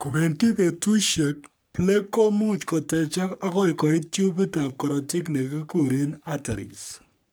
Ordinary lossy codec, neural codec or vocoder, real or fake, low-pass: none; codec, 44.1 kHz, 3.4 kbps, Pupu-Codec; fake; none